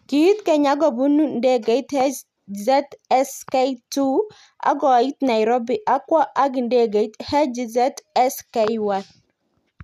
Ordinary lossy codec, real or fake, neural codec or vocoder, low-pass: none; real; none; 14.4 kHz